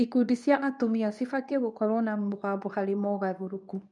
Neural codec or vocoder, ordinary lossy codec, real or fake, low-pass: codec, 24 kHz, 0.9 kbps, WavTokenizer, medium speech release version 2; none; fake; 10.8 kHz